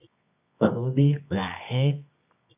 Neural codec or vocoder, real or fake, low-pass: codec, 24 kHz, 0.9 kbps, WavTokenizer, medium music audio release; fake; 3.6 kHz